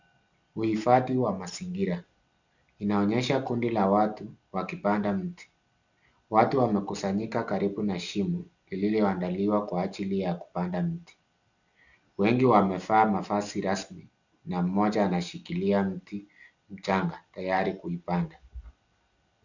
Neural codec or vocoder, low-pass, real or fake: none; 7.2 kHz; real